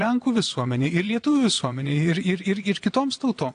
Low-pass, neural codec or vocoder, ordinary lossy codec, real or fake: 9.9 kHz; vocoder, 22.05 kHz, 80 mel bands, WaveNeXt; AAC, 48 kbps; fake